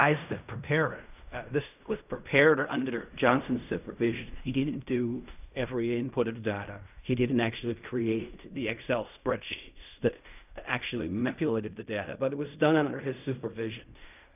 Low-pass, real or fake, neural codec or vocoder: 3.6 kHz; fake; codec, 16 kHz in and 24 kHz out, 0.4 kbps, LongCat-Audio-Codec, fine tuned four codebook decoder